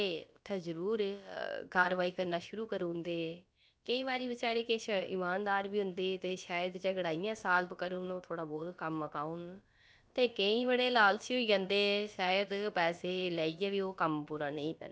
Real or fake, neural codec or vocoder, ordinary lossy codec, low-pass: fake; codec, 16 kHz, about 1 kbps, DyCAST, with the encoder's durations; none; none